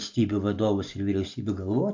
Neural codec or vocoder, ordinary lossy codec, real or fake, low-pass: none; AAC, 48 kbps; real; 7.2 kHz